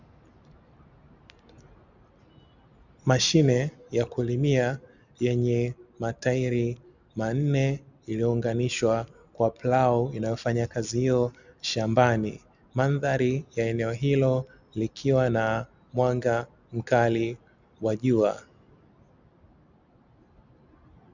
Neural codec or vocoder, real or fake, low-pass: none; real; 7.2 kHz